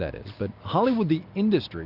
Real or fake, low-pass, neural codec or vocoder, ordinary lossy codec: real; 5.4 kHz; none; Opus, 64 kbps